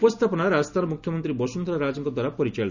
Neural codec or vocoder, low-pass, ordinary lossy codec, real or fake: none; 7.2 kHz; none; real